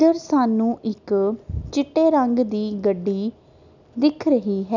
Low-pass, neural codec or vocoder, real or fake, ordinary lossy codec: 7.2 kHz; none; real; none